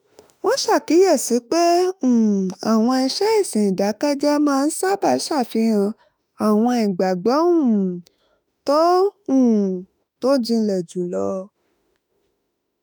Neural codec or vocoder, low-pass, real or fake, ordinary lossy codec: autoencoder, 48 kHz, 32 numbers a frame, DAC-VAE, trained on Japanese speech; none; fake; none